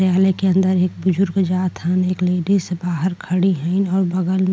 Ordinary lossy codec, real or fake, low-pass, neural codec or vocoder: none; real; none; none